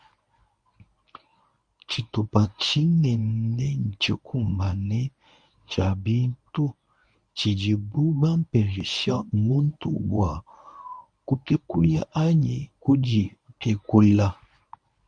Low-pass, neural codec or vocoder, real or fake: 9.9 kHz; codec, 24 kHz, 0.9 kbps, WavTokenizer, medium speech release version 1; fake